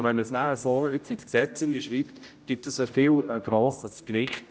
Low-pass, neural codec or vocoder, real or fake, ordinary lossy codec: none; codec, 16 kHz, 0.5 kbps, X-Codec, HuBERT features, trained on general audio; fake; none